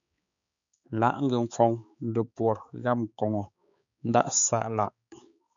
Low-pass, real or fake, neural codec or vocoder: 7.2 kHz; fake; codec, 16 kHz, 4 kbps, X-Codec, HuBERT features, trained on balanced general audio